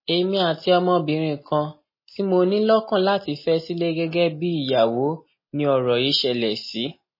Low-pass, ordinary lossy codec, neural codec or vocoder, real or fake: 5.4 kHz; MP3, 24 kbps; none; real